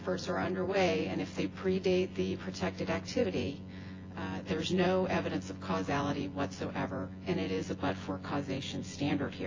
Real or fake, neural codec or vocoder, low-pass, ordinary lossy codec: fake; vocoder, 24 kHz, 100 mel bands, Vocos; 7.2 kHz; AAC, 32 kbps